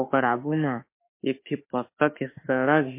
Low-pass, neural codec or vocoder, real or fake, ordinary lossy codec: 3.6 kHz; autoencoder, 48 kHz, 32 numbers a frame, DAC-VAE, trained on Japanese speech; fake; MP3, 24 kbps